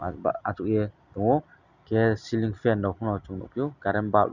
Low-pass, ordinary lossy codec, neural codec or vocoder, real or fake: 7.2 kHz; none; none; real